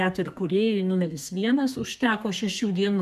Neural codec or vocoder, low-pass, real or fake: codec, 32 kHz, 1.9 kbps, SNAC; 14.4 kHz; fake